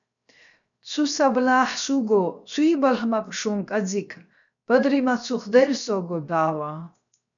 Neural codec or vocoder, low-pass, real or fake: codec, 16 kHz, 0.7 kbps, FocalCodec; 7.2 kHz; fake